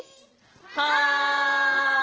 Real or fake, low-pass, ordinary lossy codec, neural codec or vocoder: real; 7.2 kHz; Opus, 16 kbps; none